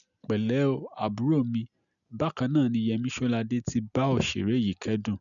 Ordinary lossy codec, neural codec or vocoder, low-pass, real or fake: none; none; 7.2 kHz; real